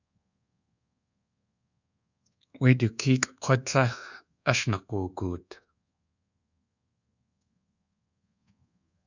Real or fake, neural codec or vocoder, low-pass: fake; codec, 24 kHz, 1.2 kbps, DualCodec; 7.2 kHz